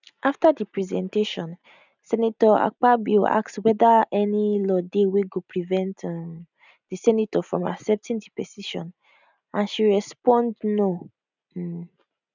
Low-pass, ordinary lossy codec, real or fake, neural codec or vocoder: 7.2 kHz; none; real; none